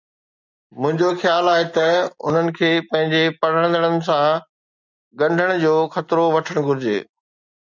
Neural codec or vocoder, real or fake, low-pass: none; real; 7.2 kHz